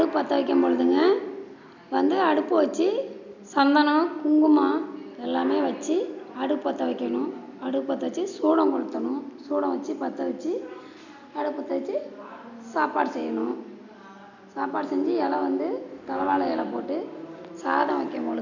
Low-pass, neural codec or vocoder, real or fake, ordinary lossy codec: 7.2 kHz; none; real; none